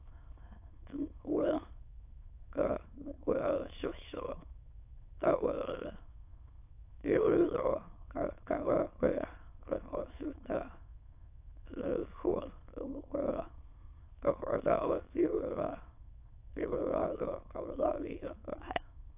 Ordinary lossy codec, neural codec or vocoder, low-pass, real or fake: AAC, 32 kbps; autoencoder, 22.05 kHz, a latent of 192 numbers a frame, VITS, trained on many speakers; 3.6 kHz; fake